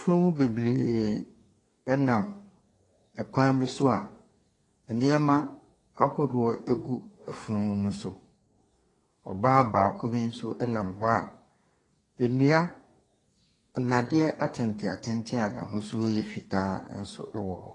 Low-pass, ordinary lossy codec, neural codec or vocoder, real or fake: 10.8 kHz; AAC, 32 kbps; codec, 24 kHz, 1 kbps, SNAC; fake